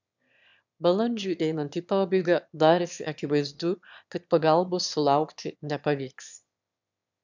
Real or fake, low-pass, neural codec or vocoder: fake; 7.2 kHz; autoencoder, 22.05 kHz, a latent of 192 numbers a frame, VITS, trained on one speaker